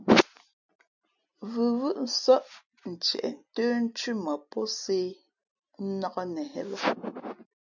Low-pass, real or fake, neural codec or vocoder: 7.2 kHz; real; none